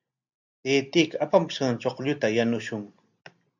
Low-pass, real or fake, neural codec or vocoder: 7.2 kHz; real; none